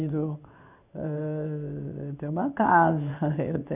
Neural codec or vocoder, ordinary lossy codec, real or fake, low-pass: codec, 16 kHz in and 24 kHz out, 1 kbps, XY-Tokenizer; none; fake; 3.6 kHz